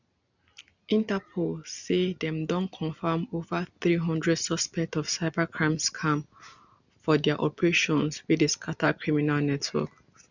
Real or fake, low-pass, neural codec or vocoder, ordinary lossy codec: real; 7.2 kHz; none; none